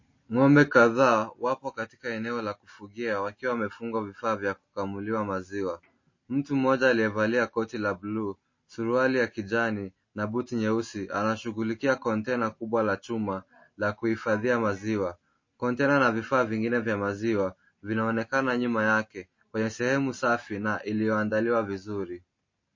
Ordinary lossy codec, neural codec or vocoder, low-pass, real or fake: MP3, 32 kbps; none; 7.2 kHz; real